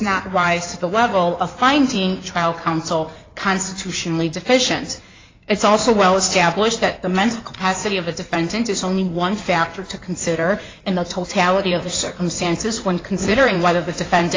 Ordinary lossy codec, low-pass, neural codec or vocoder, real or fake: AAC, 32 kbps; 7.2 kHz; codec, 16 kHz in and 24 kHz out, 2.2 kbps, FireRedTTS-2 codec; fake